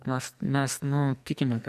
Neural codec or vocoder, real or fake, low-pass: codec, 44.1 kHz, 2.6 kbps, SNAC; fake; 14.4 kHz